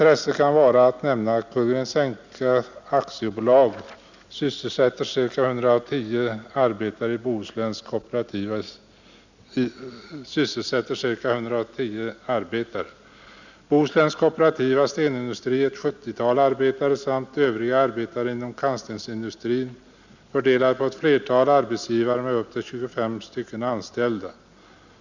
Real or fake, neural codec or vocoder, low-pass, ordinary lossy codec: real; none; 7.2 kHz; none